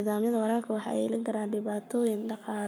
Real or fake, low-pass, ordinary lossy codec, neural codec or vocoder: fake; none; none; codec, 44.1 kHz, 7.8 kbps, Pupu-Codec